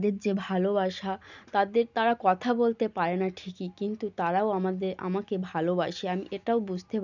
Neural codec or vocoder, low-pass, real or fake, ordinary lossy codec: none; 7.2 kHz; real; none